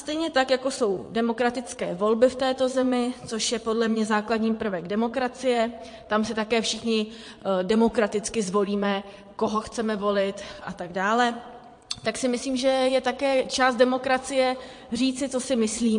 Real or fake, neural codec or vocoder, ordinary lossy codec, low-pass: fake; vocoder, 22.05 kHz, 80 mel bands, Vocos; MP3, 48 kbps; 9.9 kHz